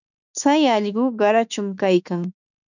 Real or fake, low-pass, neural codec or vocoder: fake; 7.2 kHz; autoencoder, 48 kHz, 32 numbers a frame, DAC-VAE, trained on Japanese speech